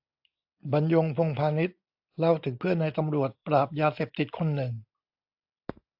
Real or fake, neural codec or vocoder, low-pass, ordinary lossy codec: real; none; 5.4 kHz; AAC, 48 kbps